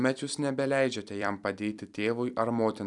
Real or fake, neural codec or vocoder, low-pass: fake; vocoder, 44.1 kHz, 128 mel bands every 256 samples, BigVGAN v2; 10.8 kHz